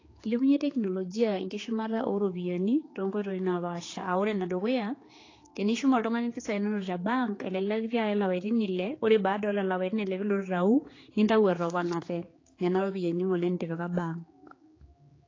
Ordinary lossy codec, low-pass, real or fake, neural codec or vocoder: AAC, 32 kbps; 7.2 kHz; fake; codec, 16 kHz, 4 kbps, X-Codec, HuBERT features, trained on general audio